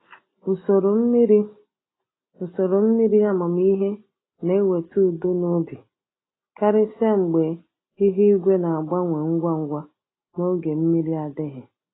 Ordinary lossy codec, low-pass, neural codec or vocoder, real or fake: AAC, 16 kbps; 7.2 kHz; none; real